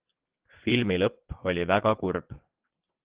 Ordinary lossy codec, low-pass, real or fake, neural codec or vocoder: Opus, 16 kbps; 3.6 kHz; fake; vocoder, 22.05 kHz, 80 mel bands, WaveNeXt